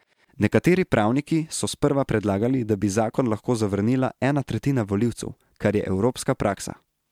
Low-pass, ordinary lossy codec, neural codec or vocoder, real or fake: 19.8 kHz; MP3, 96 kbps; none; real